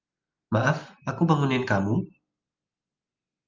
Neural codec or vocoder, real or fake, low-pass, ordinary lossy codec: none; real; 7.2 kHz; Opus, 32 kbps